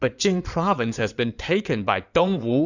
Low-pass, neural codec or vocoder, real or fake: 7.2 kHz; codec, 16 kHz in and 24 kHz out, 2.2 kbps, FireRedTTS-2 codec; fake